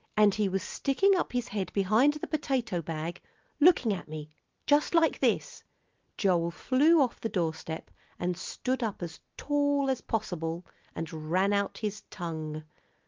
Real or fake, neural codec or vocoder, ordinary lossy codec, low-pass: real; none; Opus, 32 kbps; 7.2 kHz